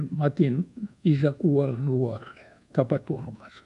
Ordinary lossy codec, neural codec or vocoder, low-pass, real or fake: MP3, 96 kbps; codec, 24 kHz, 1.2 kbps, DualCodec; 10.8 kHz; fake